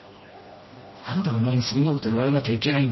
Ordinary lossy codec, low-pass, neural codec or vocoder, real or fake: MP3, 24 kbps; 7.2 kHz; codec, 16 kHz, 1 kbps, FreqCodec, smaller model; fake